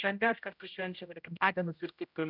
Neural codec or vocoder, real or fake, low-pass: codec, 16 kHz, 0.5 kbps, X-Codec, HuBERT features, trained on general audio; fake; 5.4 kHz